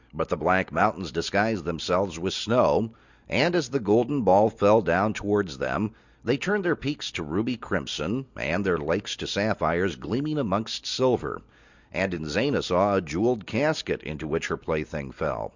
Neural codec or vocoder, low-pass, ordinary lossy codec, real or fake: none; 7.2 kHz; Opus, 64 kbps; real